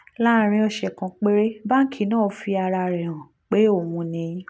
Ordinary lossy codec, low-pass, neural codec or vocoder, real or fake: none; none; none; real